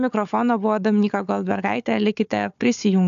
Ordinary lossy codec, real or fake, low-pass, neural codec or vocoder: AAC, 96 kbps; fake; 7.2 kHz; codec, 16 kHz, 4 kbps, FunCodec, trained on Chinese and English, 50 frames a second